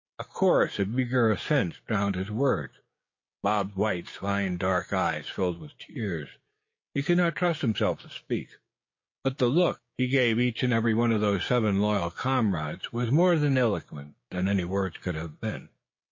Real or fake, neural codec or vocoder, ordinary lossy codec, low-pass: fake; codec, 16 kHz, 6 kbps, DAC; MP3, 32 kbps; 7.2 kHz